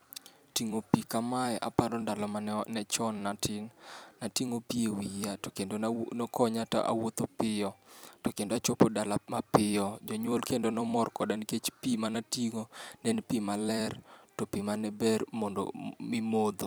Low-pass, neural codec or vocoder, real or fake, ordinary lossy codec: none; vocoder, 44.1 kHz, 128 mel bands every 256 samples, BigVGAN v2; fake; none